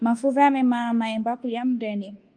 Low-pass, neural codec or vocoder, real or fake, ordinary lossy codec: 9.9 kHz; codec, 24 kHz, 1.2 kbps, DualCodec; fake; Opus, 32 kbps